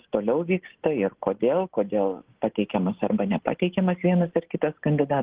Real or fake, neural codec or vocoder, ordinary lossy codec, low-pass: real; none; Opus, 16 kbps; 3.6 kHz